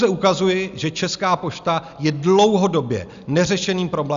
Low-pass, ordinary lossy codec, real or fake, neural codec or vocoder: 7.2 kHz; Opus, 64 kbps; real; none